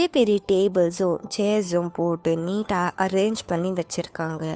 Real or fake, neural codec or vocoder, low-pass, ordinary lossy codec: fake; codec, 16 kHz, 2 kbps, FunCodec, trained on Chinese and English, 25 frames a second; none; none